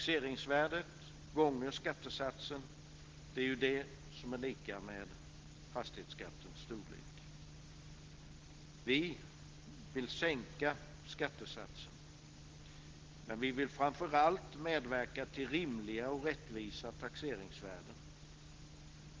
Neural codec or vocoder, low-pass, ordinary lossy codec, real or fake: none; 7.2 kHz; Opus, 16 kbps; real